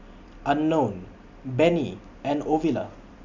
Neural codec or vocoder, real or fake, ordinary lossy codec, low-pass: none; real; none; 7.2 kHz